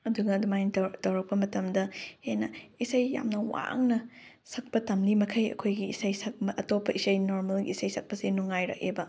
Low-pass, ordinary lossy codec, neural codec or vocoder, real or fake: none; none; none; real